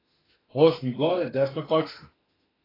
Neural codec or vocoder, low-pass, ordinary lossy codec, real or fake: autoencoder, 48 kHz, 32 numbers a frame, DAC-VAE, trained on Japanese speech; 5.4 kHz; AAC, 24 kbps; fake